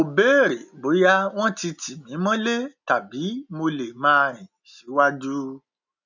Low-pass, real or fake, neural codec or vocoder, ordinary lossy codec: 7.2 kHz; real; none; none